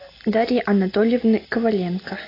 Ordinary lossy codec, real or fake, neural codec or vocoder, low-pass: AAC, 24 kbps; real; none; 5.4 kHz